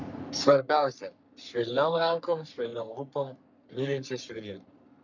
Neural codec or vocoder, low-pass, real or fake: codec, 44.1 kHz, 3.4 kbps, Pupu-Codec; 7.2 kHz; fake